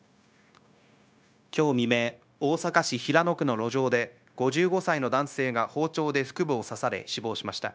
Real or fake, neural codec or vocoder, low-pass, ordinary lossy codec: fake; codec, 16 kHz, 0.9 kbps, LongCat-Audio-Codec; none; none